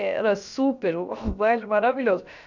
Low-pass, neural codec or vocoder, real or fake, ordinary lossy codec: 7.2 kHz; codec, 16 kHz, about 1 kbps, DyCAST, with the encoder's durations; fake; none